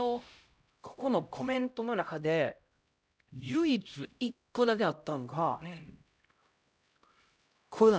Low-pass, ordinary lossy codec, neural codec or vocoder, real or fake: none; none; codec, 16 kHz, 0.5 kbps, X-Codec, HuBERT features, trained on LibriSpeech; fake